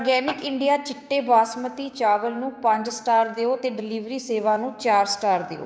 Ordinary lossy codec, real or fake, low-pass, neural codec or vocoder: none; fake; none; codec, 16 kHz, 6 kbps, DAC